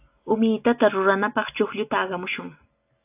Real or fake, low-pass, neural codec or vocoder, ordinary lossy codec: real; 3.6 kHz; none; AAC, 32 kbps